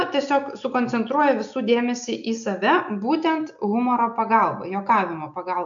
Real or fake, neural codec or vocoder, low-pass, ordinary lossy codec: real; none; 7.2 kHz; MP3, 64 kbps